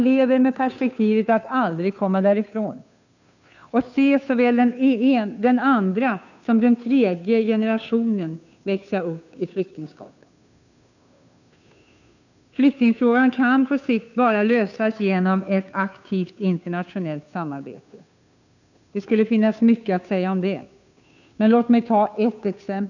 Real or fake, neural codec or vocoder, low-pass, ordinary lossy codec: fake; codec, 16 kHz, 2 kbps, FunCodec, trained on Chinese and English, 25 frames a second; 7.2 kHz; none